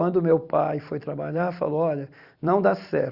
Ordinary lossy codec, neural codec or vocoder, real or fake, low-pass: Opus, 64 kbps; vocoder, 44.1 kHz, 128 mel bands every 256 samples, BigVGAN v2; fake; 5.4 kHz